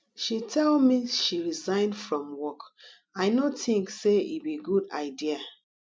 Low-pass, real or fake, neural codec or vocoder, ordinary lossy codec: none; real; none; none